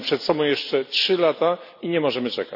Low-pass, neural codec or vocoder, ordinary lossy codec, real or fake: 5.4 kHz; none; none; real